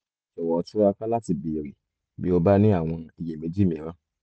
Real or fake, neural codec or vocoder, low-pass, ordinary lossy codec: real; none; none; none